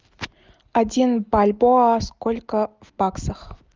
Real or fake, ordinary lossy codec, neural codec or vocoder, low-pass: real; Opus, 24 kbps; none; 7.2 kHz